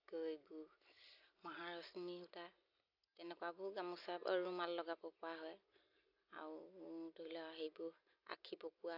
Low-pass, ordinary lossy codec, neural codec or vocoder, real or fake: 5.4 kHz; AAC, 48 kbps; none; real